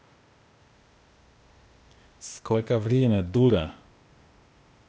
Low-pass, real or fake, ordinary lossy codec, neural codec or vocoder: none; fake; none; codec, 16 kHz, 0.8 kbps, ZipCodec